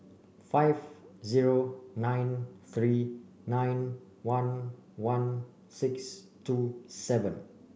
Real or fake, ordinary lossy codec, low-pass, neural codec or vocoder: real; none; none; none